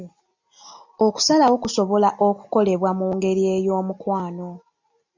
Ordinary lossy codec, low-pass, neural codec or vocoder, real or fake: MP3, 64 kbps; 7.2 kHz; none; real